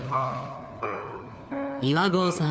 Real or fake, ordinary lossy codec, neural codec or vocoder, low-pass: fake; none; codec, 16 kHz, 4 kbps, FunCodec, trained on LibriTTS, 50 frames a second; none